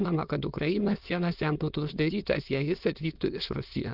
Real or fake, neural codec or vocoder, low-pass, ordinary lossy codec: fake; autoencoder, 22.05 kHz, a latent of 192 numbers a frame, VITS, trained on many speakers; 5.4 kHz; Opus, 32 kbps